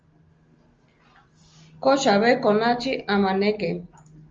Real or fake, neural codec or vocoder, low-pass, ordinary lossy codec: real; none; 7.2 kHz; Opus, 32 kbps